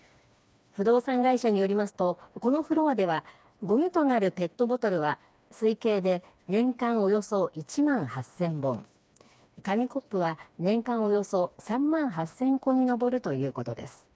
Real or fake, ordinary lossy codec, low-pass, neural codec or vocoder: fake; none; none; codec, 16 kHz, 2 kbps, FreqCodec, smaller model